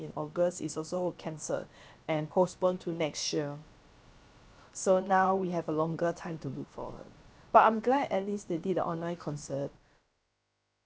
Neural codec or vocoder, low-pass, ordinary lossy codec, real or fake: codec, 16 kHz, about 1 kbps, DyCAST, with the encoder's durations; none; none; fake